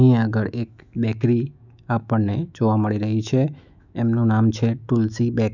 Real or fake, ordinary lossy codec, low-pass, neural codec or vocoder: fake; none; 7.2 kHz; autoencoder, 48 kHz, 128 numbers a frame, DAC-VAE, trained on Japanese speech